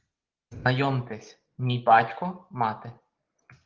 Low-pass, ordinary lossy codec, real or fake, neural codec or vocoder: 7.2 kHz; Opus, 16 kbps; real; none